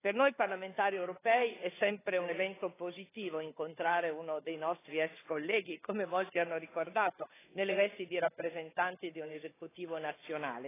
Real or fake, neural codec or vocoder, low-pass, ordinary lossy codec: fake; codec, 16 kHz, 4 kbps, FunCodec, trained on Chinese and English, 50 frames a second; 3.6 kHz; AAC, 16 kbps